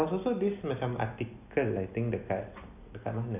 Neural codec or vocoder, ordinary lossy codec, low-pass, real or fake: none; none; 3.6 kHz; real